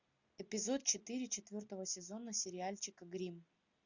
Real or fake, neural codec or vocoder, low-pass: real; none; 7.2 kHz